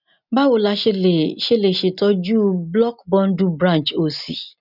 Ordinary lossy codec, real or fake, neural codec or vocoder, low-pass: none; real; none; 5.4 kHz